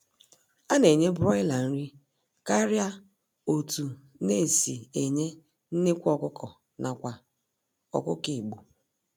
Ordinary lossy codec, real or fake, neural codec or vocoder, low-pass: none; real; none; none